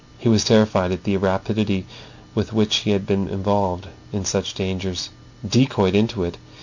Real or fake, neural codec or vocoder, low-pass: real; none; 7.2 kHz